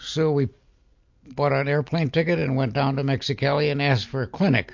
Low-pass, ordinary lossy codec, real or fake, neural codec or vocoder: 7.2 kHz; MP3, 48 kbps; real; none